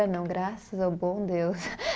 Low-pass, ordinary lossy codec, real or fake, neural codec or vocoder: none; none; real; none